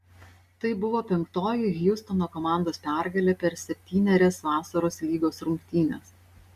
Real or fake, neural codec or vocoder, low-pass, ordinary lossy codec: real; none; 14.4 kHz; Opus, 64 kbps